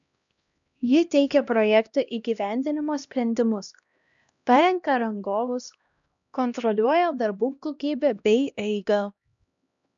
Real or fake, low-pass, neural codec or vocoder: fake; 7.2 kHz; codec, 16 kHz, 1 kbps, X-Codec, HuBERT features, trained on LibriSpeech